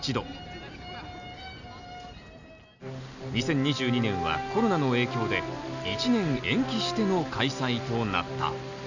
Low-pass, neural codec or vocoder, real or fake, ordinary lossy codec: 7.2 kHz; none; real; none